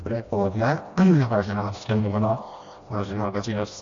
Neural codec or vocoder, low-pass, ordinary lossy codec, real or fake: codec, 16 kHz, 1 kbps, FreqCodec, smaller model; 7.2 kHz; AAC, 48 kbps; fake